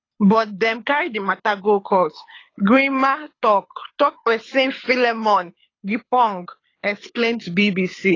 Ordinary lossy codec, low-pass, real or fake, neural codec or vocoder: AAC, 32 kbps; 7.2 kHz; fake; codec, 24 kHz, 6 kbps, HILCodec